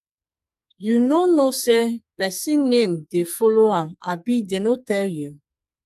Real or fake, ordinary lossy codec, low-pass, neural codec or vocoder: fake; AAC, 96 kbps; 14.4 kHz; codec, 44.1 kHz, 2.6 kbps, SNAC